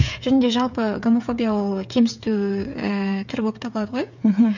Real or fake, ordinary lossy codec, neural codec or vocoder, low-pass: fake; none; codec, 16 kHz, 8 kbps, FreqCodec, smaller model; 7.2 kHz